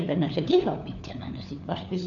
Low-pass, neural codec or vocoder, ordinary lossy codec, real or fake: 7.2 kHz; codec, 16 kHz, 4 kbps, FunCodec, trained on LibriTTS, 50 frames a second; none; fake